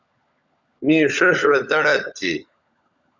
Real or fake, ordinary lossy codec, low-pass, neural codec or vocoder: fake; Opus, 64 kbps; 7.2 kHz; codec, 16 kHz, 16 kbps, FunCodec, trained on LibriTTS, 50 frames a second